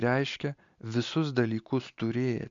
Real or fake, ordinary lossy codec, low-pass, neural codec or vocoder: real; MP3, 64 kbps; 7.2 kHz; none